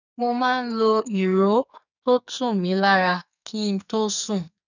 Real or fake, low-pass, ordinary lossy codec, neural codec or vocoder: fake; 7.2 kHz; none; codec, 44.1 kHz, 2.6 kbps, SNAC